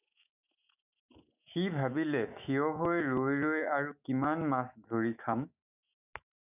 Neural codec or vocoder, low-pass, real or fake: autoencoder, 48 kHz, 128 numbers a frame, DAC-VAE, trained on Japanese speech; 3.6 kHz; fake